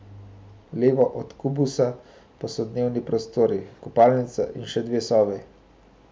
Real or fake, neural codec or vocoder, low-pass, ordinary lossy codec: real; none; none; none